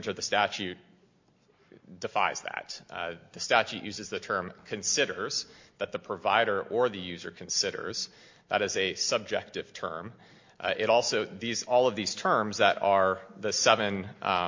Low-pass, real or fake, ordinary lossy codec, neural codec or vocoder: 7.2 kHz; real; MP3, 48 kbps; none